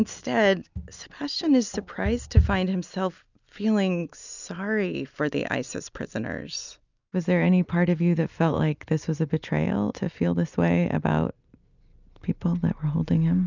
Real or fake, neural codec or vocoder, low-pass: real; none; 7.2 kHz